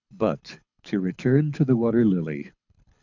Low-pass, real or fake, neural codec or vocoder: 7.2 kHz; fake; codec, 24 kHz, 6 kbps, HILCodec